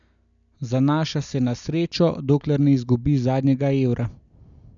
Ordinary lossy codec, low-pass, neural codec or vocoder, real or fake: none; 7.2 kHz; none; real